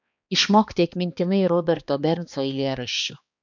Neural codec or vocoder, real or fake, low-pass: codec, 16 kHz, 2 kbps, X-Codec, HuBERT features, trained on balanced general audio; fake; 7.2 kHz